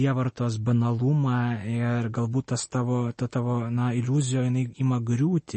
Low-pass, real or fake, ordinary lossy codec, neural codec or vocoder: 10.8 kHz; real; MP3, 32 kbps; none